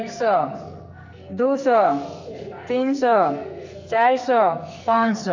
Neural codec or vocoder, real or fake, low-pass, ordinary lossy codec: codec, 44.1 kHz, 2.6 kbps, SNAC; fake; 7.2 kHz; MP3, 64 kbps